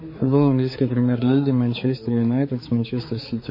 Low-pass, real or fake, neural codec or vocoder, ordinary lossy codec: 5.4 kHz; fake; codec, 16 kHz, 4 kbps, X-Codec, HuBERT features, trained on balanced general audio; MP3, 24 kbps